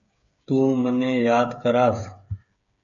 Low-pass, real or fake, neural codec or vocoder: 7.2 kHz; fake; codec, 16 kHz, 8 kbps, FreqCodec, smaller model